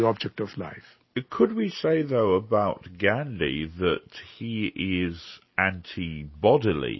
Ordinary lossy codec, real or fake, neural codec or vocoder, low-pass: MP3, 24 kbps; real; none; 7.2 kHz